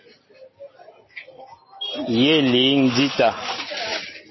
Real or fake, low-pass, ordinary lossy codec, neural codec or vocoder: real; 7.2 kHz; MP3, 24 kbps; none